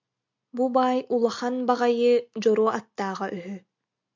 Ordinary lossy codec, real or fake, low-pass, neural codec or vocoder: MP3, 64 kbps; real; 7.2 kHz; none